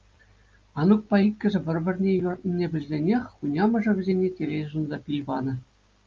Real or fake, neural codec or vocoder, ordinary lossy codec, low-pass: real; none; Opus, 24 kbps; 7.2 kHz